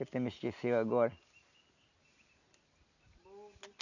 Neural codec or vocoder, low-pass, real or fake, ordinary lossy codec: none; 7.2 kHz; real; none